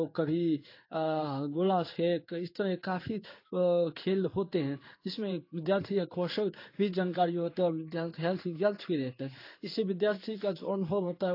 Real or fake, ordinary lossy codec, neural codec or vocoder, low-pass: fake; AAC, 32 kbps; codec, 16 kHz in and 24 kHz out, 1 kbps, XY-Tokenizer; 5.4 kHz